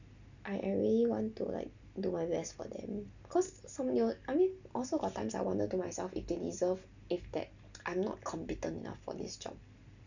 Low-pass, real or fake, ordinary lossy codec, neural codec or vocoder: 7.2 kHz; real; none; none